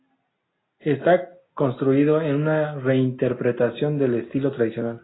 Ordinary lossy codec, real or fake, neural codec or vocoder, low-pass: AAC, 16 kbps; real; none; 7.2 kHz